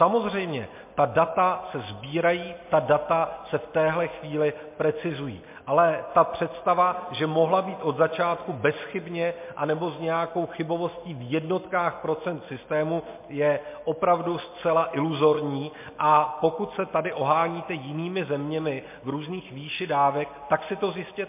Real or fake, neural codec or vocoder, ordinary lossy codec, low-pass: real; none; MP3, 24 kbps; 3.6 kHz